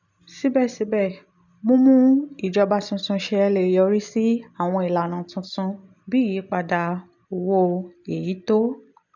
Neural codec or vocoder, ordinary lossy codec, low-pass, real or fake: none; none; 7.2 kHz; real